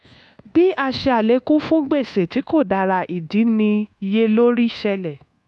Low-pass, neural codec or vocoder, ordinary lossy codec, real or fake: none; codec, 24 kHz, 1.2 kbps, DualCodec; none; fake